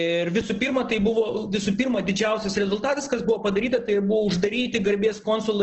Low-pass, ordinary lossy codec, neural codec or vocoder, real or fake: 7.2 kHz; Opus, 16 kbps; none; real